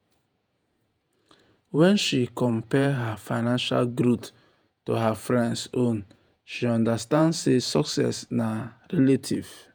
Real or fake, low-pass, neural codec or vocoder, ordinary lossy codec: fake; none; vocoder, 48 kHz, 128 mel bands, Vocos; none